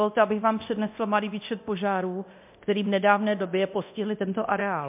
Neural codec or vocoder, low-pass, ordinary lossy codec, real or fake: codec, 24 kHz, 0.9 kbps, DualCodec; 3.6 kHz; MP3, 32 kbps; fake